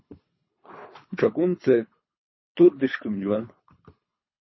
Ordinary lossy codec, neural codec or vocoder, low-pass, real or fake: MP3, 24 kbps; codec, 24 kHz, 3 kbps, HILCodec; 7.2 kHz; fake